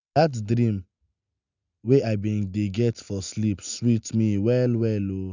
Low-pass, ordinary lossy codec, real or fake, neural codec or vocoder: 7.2 kHz; none; real; none